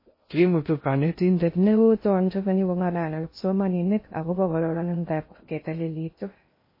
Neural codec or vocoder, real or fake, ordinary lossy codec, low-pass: codec, 16 kHz in and 24 kHz out, 0.6 kbps, FocalCodec, streaming, 2048 codes; fake; MP3, 24 kbps; 5.4 kHz